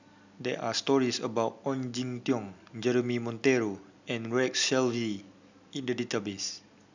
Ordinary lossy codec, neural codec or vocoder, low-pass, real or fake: none; none; 7.2 kHz; real